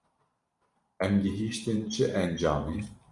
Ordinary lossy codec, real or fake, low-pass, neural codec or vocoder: Opus, 64 kbps; fake; 10.8 kHz; vocoder, 44.1 kHz, 128 mel bands every 512 samples, BigVGAN v2